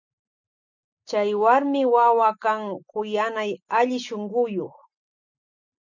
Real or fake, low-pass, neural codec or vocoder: real; 7.2 kHz; none